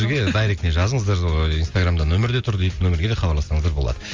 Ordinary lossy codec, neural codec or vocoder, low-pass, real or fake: Opus, 32 kbps; none; 7.2 kHz; real